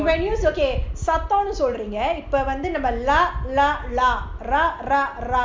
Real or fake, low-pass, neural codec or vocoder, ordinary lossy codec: real; none; none; none